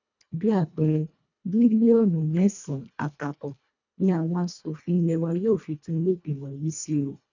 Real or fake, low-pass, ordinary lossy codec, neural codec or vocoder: fake; 7.2 kHz; none; codec, 24 kHz, 1.5 kbps, HILCodec